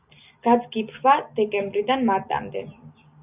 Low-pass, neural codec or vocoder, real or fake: 3.6 kHz; none; real